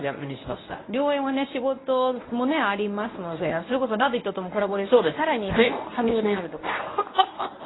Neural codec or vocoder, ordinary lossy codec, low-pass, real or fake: codec, 24 kHz, 0.9 kbps, WavTokenizer, medium speech release version 1; AAC, 16 kbps; 7.2 kHz; fake